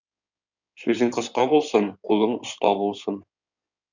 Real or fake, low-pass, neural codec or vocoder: fake; 7.2 kHz; codec, 16 kHz in and 24 kHz out, 2.2 kbps, FireRedTTS-2 codec